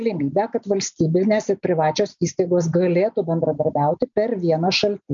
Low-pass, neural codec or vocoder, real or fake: 7.2 kHz; none; real